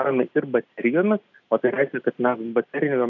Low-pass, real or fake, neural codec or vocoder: 7.2 kHz; real; none